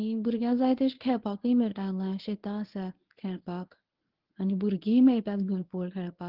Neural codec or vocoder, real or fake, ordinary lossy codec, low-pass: codec, 24 kHz, 0.9 kbps, WavTokenizer, medium speech release version 1; fake; Opus, 16 kbps; 5.4 kHz